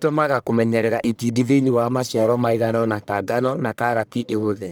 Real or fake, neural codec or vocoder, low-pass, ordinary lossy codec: fake; codec, 44.1 kHz, 1.7 kbps, Pupu-Codec; none; none